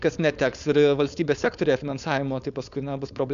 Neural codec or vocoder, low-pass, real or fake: codec, 16 kHz, 4.8 kbps, FACodec; 7.2 kHz; fake